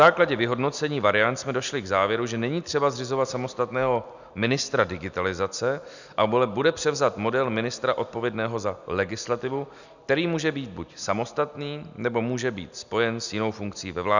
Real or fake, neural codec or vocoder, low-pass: real; none; 7.2 kHz